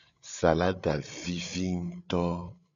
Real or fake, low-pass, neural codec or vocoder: fake; 7.2 kHz; codec, 16 kHz, 8 kbps, FreqCodec, larger model